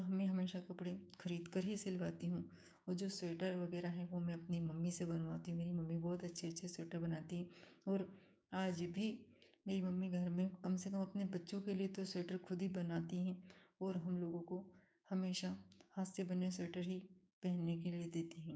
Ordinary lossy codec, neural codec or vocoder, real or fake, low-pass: none; codec, 16 kHz, 6 kbps, DAC; fake; none